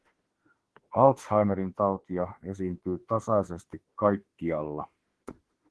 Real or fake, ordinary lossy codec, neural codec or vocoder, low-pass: fake; Opus, 16 kbps; autoencoder, 48 kHz, 32 numbers a frame, DAC-VAE, trained on Japanese speech; 10.8 kHz